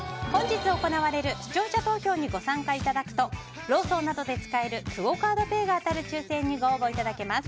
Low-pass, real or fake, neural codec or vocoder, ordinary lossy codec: none; real; none; none